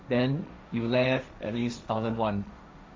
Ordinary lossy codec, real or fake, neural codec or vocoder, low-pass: none; fake; codec, 16 kHz, 1.1 kbps, Voila-Tokenizer; none